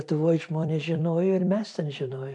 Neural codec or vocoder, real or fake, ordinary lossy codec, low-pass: vocoder, 44.1 kHz, 128 mel bands every 256 samples, BigVGAN v2; fake; MP3, 64 kbps; 10.8 kHz